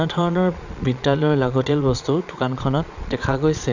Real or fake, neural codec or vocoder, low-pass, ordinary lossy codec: fake; vocoder, 22.05 kHz, 80 mel bands, Vocos; 7.2 kHz; none